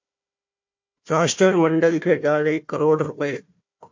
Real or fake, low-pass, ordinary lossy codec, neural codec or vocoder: fake; 7.2 kHz; MP3, 48 kbps; codec, 16 kHz, 1 kbps, FunCodec, trained on Chinese and English, 50 frames a second